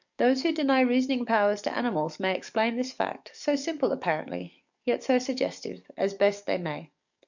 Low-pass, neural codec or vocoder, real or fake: 7.2 kHz; codec, 44.1 kHz, 7.8 kbps, DAC; fake